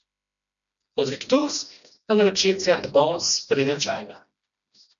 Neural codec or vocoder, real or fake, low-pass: codec, 16 kHz, 1 kbps, FreqCodec, smaller model; fake; 7.2 kHz